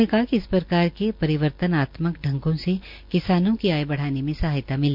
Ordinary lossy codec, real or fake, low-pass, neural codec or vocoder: MP3, 48 kbps; real; 5.4 kHz; none